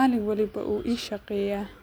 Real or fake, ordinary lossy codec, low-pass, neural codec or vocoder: real; none; none; none